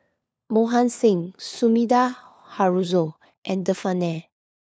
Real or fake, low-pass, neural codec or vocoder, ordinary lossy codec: fake; none; codec, 16 kHz, 4 kbps, FunCodec, trained on LibriTTS, 50 frames a second; none